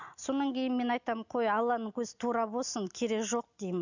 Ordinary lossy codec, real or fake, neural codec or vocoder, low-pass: none; real; none; 7.2 kHz